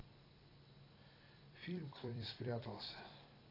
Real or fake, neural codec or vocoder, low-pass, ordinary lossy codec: fake; vocoder, 44.1 kHz, 128 mel bands every 256 samples, BigVGAN v2; 5.4 kHz; AAC, 24 kbps